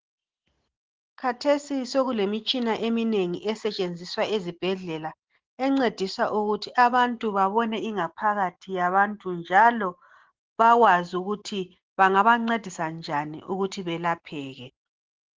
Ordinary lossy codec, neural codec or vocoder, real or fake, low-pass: Opus, 16 kbps; none; real; 7.2 kHz